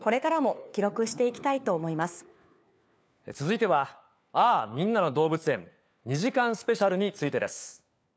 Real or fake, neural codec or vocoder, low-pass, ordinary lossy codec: fake; codec, 16 kHz, 4 kbps, FunCodec, trained on LibriTTS, 50 frames a second; none; none